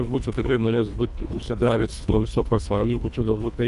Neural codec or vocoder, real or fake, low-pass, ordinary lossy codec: codec, 24 kHz, 1.5 kbps, HILCodec; fake; 10.8 kHz; AAC, 96 kbps